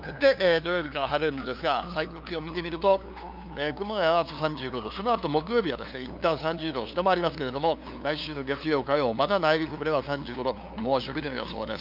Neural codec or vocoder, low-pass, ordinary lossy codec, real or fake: codec, 16 kHz, 2 kbps, FunCodec, trained on LibriTTS, 25 frames a second; 5.4 kHz; none; fake